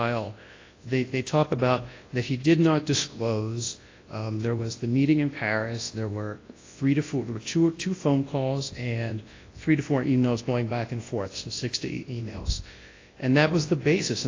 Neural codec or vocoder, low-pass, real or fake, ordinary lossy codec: codec, 24 kHz, 0.9 kbps, WavTokenizer, large speech release; 7.2 kHz; fake; AAC, 32 kbps